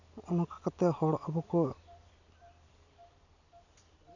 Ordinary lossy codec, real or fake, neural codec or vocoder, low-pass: none; real; none; 7.2 kHz